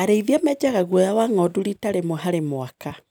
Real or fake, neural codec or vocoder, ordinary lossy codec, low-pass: real; none; none; none